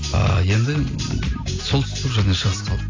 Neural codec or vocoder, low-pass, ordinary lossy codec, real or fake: none; 7.2 kHz; AAC, 32 kbps; real